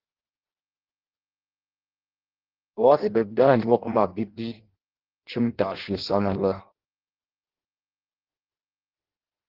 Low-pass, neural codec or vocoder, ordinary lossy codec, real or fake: 5.4 kHz; codec, 16 kHz in and 24 kHz out, 0.6 kbps, FireRedTTS-2 codec; Opus, 16 kbps; fake